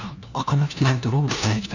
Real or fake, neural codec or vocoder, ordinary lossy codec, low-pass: fake; codec, 16 kHz, 1 kbps, FunCodec, trained on LibriTTS, 50 frames a second; none; 7.2 kHz